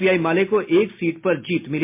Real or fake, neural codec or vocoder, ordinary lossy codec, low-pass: real; none; MP3, 24 kbps; 3.6 kHz